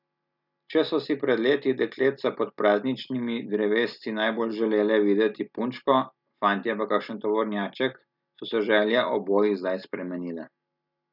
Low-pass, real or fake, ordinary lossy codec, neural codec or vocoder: 5.4 kHz; real; none; none